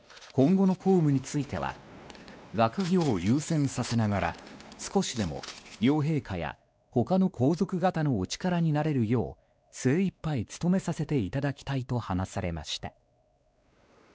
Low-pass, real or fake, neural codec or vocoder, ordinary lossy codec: none; fake; codec, 16 kHz, 2 kbps, X-Codec, WavLM features, trained on Multilingual LibriSpeech; none